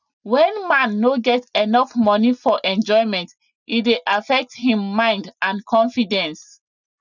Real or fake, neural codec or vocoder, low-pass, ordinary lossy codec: real; none; 7.2 kHz; none